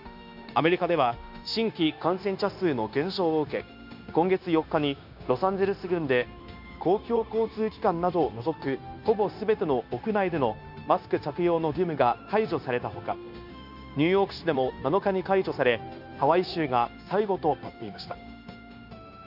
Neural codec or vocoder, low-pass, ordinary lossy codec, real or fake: codec, 16 kHz, 0.9 kbps, LongCat-Audio-Codec; 5.4 kHz; none; fake